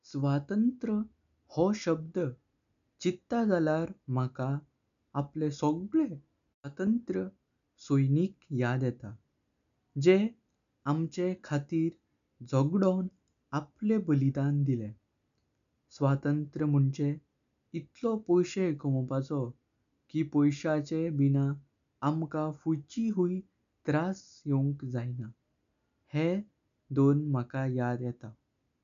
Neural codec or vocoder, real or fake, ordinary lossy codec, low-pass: none; real; none; 7.2 kHz